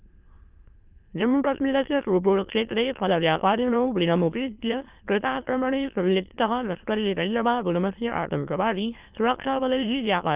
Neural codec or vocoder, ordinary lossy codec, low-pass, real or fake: autoencoder, 22.05 kHz, a latent of 192 numbers a frame, VITS, trained on many speakers; Opus, 24 kbps; 3.6 kHz; fake